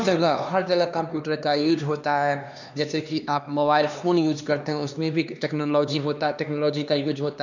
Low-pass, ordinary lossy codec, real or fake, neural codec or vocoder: 7.2 kHz; none; fake; codec, 16 kHz, 2 kbps, X-Codec, HuBERT features, trained on LibriSpeech